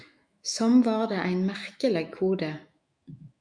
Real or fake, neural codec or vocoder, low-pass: fake; autoencoder, 48 kHz, 128 numbers a frame, DAC-VAE, trained on Japanese speech; 9.9 kHz